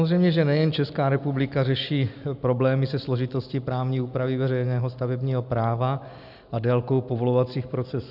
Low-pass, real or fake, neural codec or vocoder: 5.4 kHz; real; none